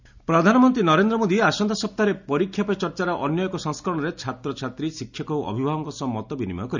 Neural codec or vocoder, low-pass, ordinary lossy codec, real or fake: none; 7.2 kHz; none; real